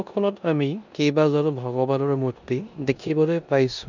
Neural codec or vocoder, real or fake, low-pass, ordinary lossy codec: codec, 16 kHz in and 24 kHz out, 0.9 kbps, LongCat-Audio-Codec, four codebook decoder; fake; 7.2 kHz; none